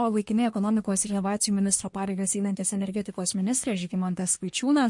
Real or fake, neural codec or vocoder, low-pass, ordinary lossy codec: fake; codec, 24 kHz, 1 kbps, SNAC; 10.8 kHz; MP3, 48 kbps